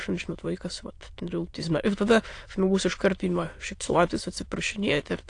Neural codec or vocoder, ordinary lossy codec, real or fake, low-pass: autoencoder, 22.05 kHz, a latent of 192 numbers a frame, VITS, trained on many speakers; AAC, 48 kbps; fake; 9.9 kHz